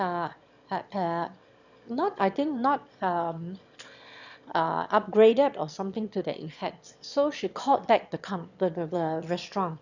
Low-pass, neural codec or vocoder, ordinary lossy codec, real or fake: 7.2 kHz; autoencoder, 22.05 kHz, a latent of 192 numbers a frame, VITS, trained on one speaker; none; fake